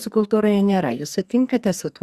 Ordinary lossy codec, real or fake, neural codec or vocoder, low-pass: Opus, 64 kbps; fake; codec, 44.1 kHz, 2.6 kbps, SNAC; 14.4 kHz